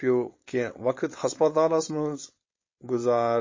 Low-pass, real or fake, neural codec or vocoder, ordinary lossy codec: 7.2 kHz; fake; codec, 16 kHz, 4.8 kbps, FACodec; MP3, 32 kbps